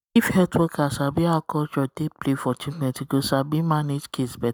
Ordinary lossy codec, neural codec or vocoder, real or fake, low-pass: none; none; real; none